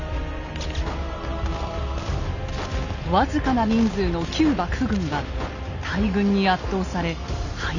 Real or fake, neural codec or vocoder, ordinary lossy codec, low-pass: real; none; none; 7.2 kHz